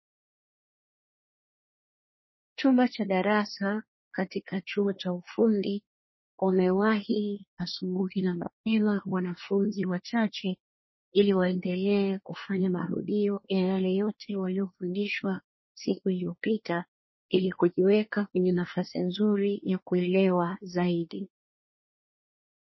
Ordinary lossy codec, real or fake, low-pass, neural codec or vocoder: MP3, 24 kbps; fake; 7.2 kHz; codec, 24 kHz, 1 kbps, SNAC